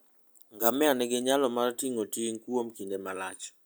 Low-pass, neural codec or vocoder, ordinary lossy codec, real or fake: none; none; none; real